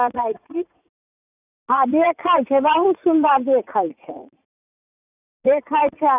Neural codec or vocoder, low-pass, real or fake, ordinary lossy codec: vocoder, 44.1 kHz, 80 mel bands, Vocos; 3.6 kHz; fake; none